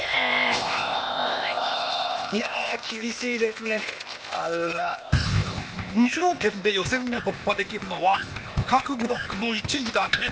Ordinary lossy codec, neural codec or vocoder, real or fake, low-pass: none; codec, 16 kHz, 0.8 kbps, ZipCodec; fake; none